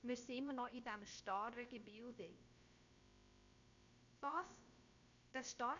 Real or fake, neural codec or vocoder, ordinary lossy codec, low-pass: fake; codec, 16 kHz, about 1 kbps, DyCAST, with the encoder's durations; none; 7.2 kHz